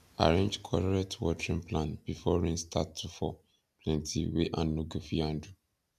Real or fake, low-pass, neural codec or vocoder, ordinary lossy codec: real; 14.4 kHz; none; none